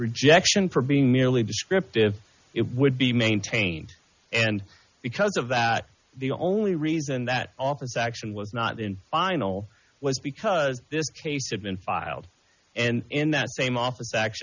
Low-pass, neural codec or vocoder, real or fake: 7.2 kHz; none; real